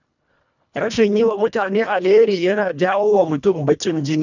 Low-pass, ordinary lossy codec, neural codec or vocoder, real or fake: 7.2 kHz; none; codec, 24 kHz, 1.5 kbps, HILCodec; fake